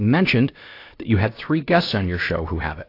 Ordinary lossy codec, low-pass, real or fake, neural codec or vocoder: AAC, 32 kbps; 5.4 kHz; fake; codec, 16 kHz, about 1 kbps, DyCAST, with the encoder's durations